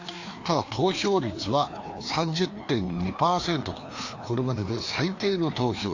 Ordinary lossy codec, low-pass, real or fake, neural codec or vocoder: AAC, 48 kbps; 7.2 kHz; fake; codec, 16 kHz, 2 kbps, FreqCodec, larger model